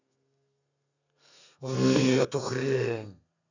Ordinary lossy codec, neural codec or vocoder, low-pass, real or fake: none; codec, 32 kHz, 1.9 kbps, SNAC; 7.2 kHz; fake